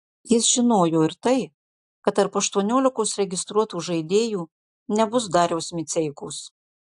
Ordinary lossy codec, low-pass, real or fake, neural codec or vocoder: AAC, 64 kbps; 10.8 kHz; real; none